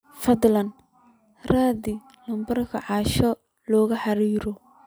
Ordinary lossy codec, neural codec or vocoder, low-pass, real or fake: none; none; none; real